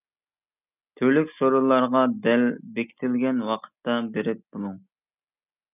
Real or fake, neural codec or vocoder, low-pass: real; none; 3.6 kHz